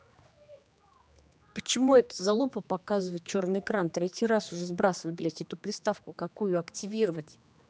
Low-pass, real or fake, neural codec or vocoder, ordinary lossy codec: none; fake; codec, 16 kHz, 2 kbps, X-Codec, HuBERT features, trained on general audio; none